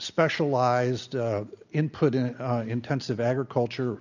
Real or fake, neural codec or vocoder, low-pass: real; none; 7.2 kHz